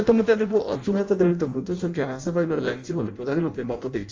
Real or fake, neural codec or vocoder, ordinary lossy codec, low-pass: fake; codec, 16 kHz in and 24 kHz out, 0.6 kbps, FireRedTTS-2 codec; Opus, 32 kbps; 7.2 kHz